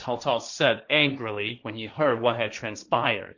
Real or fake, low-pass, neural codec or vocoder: fake; 7.2 kHz; codec, 16 kHz, 1.1 kbps, Voila-Tokenizer